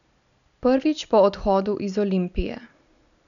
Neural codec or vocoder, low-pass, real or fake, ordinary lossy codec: none; 7.2 kHz; real; none